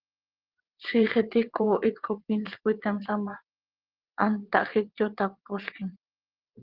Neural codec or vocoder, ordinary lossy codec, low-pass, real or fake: vocoder, 22.05 kHz, 80 mel bands, WaveNeXt; Opus, 16 kbps; 5.4 kHz; fake